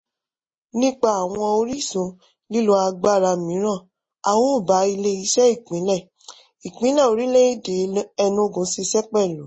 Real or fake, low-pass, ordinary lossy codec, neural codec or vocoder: real; 9.9 kHz; MP3, 32 kbps; none